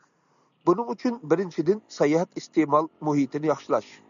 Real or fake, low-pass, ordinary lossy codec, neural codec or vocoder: real; 7.2 kHz; MP3, 96 kbps; none